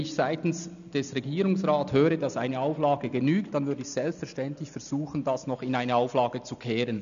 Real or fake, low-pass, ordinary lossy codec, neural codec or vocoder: real; 7.2 kHz; none; none